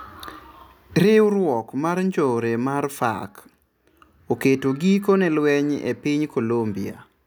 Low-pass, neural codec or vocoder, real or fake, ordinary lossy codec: none; none; real; none